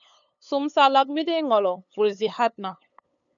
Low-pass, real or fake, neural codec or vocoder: 7.2 kHz; fake; codec, 16 kHz, 8 kbps, FunCodec, trained on LibriTTS, 25 frames a second